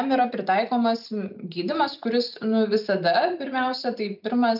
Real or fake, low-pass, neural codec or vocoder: real; 5.4 kHz; none